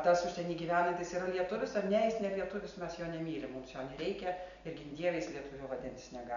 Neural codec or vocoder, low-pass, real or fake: none; 7.2 kHz; real